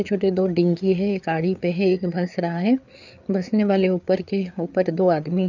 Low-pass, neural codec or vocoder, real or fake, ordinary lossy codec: 7.2 kHz; codec, 16 kHz, 4 kbps, FreqCodec, larger model; fake; none